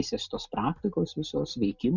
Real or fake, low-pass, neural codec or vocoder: real; 7.2 kHz; none